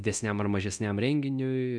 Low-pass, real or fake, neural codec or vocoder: 9.9 kHz; fake; codec, 24 kHz, 0.9 kbps, DualCodec